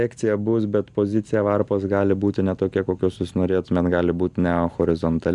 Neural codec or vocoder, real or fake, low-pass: none; real; 10.8 kHz